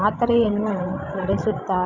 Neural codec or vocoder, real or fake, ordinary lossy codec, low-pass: codec, 16 kHz, 16 kbps, FreqCodec, larger model; fake; none; 7.2 kHz